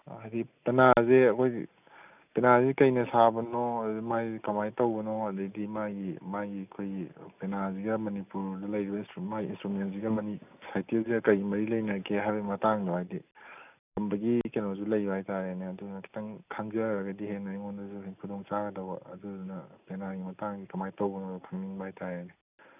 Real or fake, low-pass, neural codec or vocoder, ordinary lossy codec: real; 3.6 kHz; none; none